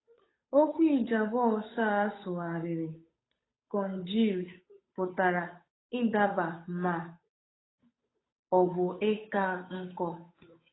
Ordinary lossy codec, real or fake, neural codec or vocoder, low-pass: AAC, 16 kbps; fake; codec, 16 kHz, 8 kbps, FunCodec, trained on Chinese and English, 25 frames a second; 7.2 kHz